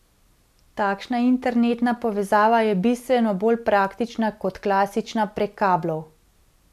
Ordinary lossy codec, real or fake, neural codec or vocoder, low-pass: none; real; none; 14.4 kHz